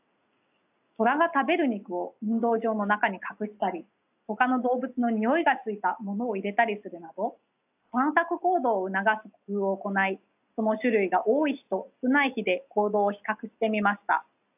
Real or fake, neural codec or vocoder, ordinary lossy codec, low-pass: real; none; AAC, 32 kbps; 3.6 kHz